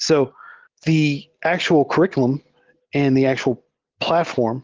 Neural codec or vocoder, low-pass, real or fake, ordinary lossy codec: none; 7.2 kHz; real; Opus, 16 kbps